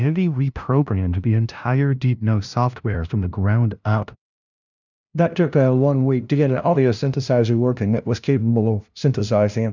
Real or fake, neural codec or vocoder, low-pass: fake; codec, 16 kHz, 0.5 kbps, FunCodec, trained on LibriTTS, 25 frames a second; 7.2 kHz